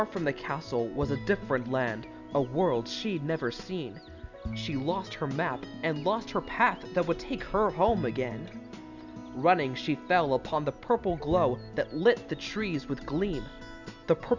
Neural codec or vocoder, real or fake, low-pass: none; real; 7.2 kHz